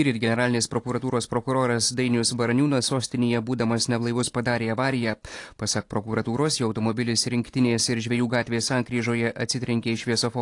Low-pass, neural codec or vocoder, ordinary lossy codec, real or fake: 10.8 kHz; none; AAC, 48 kbps; real